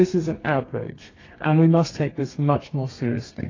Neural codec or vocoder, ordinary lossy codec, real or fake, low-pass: codec, 32 kHz, 1.9 kbps, SNAC; AAC, 32 kbps; fake; 7.2 kHz